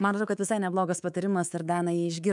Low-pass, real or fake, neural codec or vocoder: 10.8 kHz; fake; codec, 24 kHz, 3.1 kbps, DualCodec